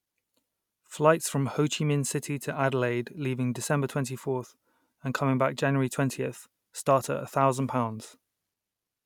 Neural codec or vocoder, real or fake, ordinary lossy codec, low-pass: none; real; none; 19.8 kHz